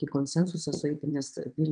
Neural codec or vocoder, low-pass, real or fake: vocoder, 22.05 kHz, 80 mel bands, WaveNeXt; 9.9 kHz; fake